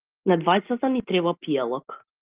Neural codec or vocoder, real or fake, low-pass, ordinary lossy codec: none; real; 3.6 kHz; Opus, 32 kbps